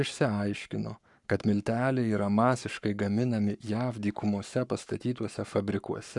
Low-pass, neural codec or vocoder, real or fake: 10.8 kHz; codec, 44.1 kHz, 7.8 kbps, Pupu-Codec; fake